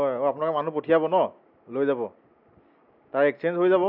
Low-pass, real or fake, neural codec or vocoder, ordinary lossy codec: 5.4 kHz; real; none; none